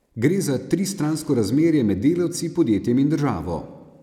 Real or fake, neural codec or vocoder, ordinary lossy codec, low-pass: real; none; none; 19.8 kHz